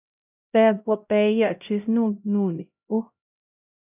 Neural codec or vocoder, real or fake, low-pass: codec, 16 kHz, 0.5 kbps, X-Codec, HuBERT features, trained on LibriSpeech; fake; 3.6 kHz